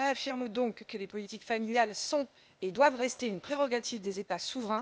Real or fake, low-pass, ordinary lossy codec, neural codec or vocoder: fake; none; none; codec, 16 kHz, 0.8 kbps, ZipCodec